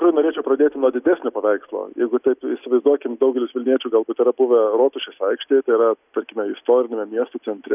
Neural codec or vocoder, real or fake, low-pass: none; real; 3.6 kHz